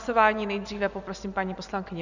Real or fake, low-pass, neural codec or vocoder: fake; 7.2 kHz; vocoder, 24 kHz, 100 mel bands, Vocos